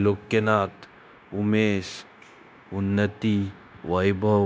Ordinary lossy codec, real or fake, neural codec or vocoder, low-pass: none; fake; codec, 16 kHz, 0.9 kbps, LongCat-Audio-Codec; none